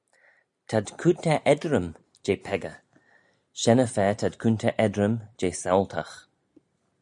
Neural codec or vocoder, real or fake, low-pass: none; real; 10.8 kHz